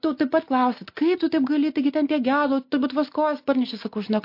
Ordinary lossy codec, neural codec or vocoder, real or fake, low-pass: MP3, 32 kbps; none; real; 5.4 kHz